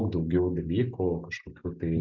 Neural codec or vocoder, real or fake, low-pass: none; real; 7.2 kHz